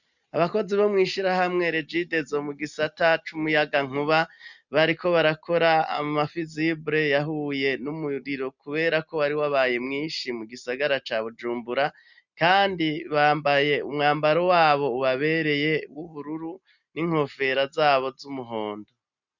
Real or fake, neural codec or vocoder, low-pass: real; none; 7.2 kHz